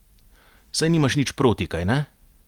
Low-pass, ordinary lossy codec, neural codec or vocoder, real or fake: 19.8 kHz; Opus, 32 kbps; none; real